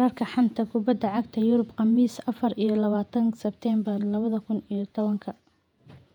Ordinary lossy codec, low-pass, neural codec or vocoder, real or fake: none; 19.8 kHz; vocoder, 48 kHz, 128 mel bands, Vocos; fake